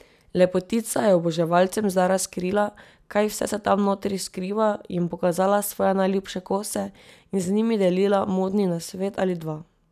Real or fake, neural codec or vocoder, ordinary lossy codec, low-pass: real; none; none; 14.4 kHz